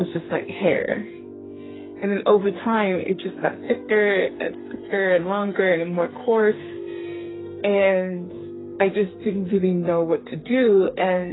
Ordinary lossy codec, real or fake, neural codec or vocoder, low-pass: AAC, 16 kbps; fake; codec, 44.1 kHz, 2.6 kbps, SNAC; 7.2 kHz